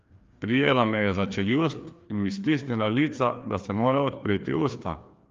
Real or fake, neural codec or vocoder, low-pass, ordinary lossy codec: fake; codec, 16 kHz, 2 kbps, FreqCodec, larger model; 7.2 kHz; Opus, 32 kbps